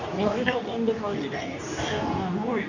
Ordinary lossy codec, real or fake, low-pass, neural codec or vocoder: none; fake; 7.2 kHz; codec, 24 kHz, 0.9 kbps, WavTokenizer, medium speech release version 2